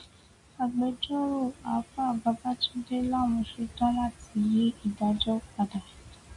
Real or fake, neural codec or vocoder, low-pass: real; none; 10.8 kHz